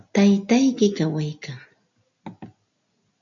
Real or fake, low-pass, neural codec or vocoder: real; 7.2 kHz; none